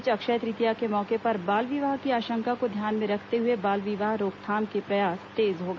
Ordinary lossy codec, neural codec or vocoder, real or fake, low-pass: none; none; real; none